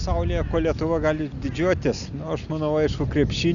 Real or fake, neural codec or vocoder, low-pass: real; none; 7.2 kHz